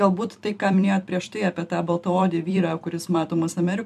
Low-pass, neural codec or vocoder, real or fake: 14.4 kHz; vocoder, 44.1 kHz, 128 mel bands every 256 samples, BigVGAN v2; fake